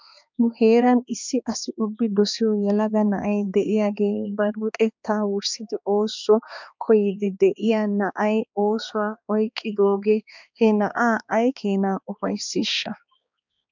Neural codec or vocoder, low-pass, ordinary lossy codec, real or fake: codec, 16 kHz, 2 kbps, X-Codec, HuBERT features, trained on balanced general audio; 7.2 kHz; MP3, 64 kbps; fake